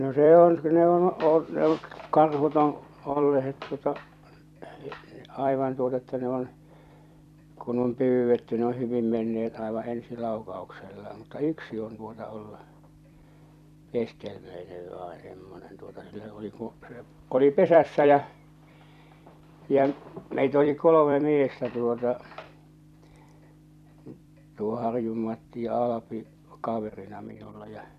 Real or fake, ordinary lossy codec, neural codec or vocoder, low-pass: fake; none; vocoder, 44.1 kHz, 128 mel bands every 256 samples, BigVGAN v2; 14.4 kHz